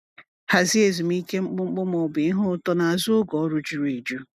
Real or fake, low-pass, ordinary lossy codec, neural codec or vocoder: real; 14.4 kHz; none; none